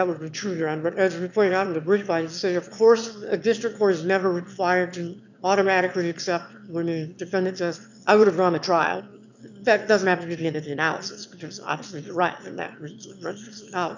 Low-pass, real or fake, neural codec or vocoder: 7.2 kHz; fake; autoencoder, 22.05 kHz, a latent of 192 numbers a frame, VITS, trained on one speaker